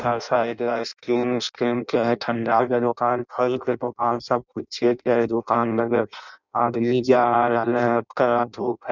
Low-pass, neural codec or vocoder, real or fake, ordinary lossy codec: 7.2 kHz; codec, 16 kHz in and 24 kHz out, 0.6 kbps, FireRedTTS-2 codec; fake; none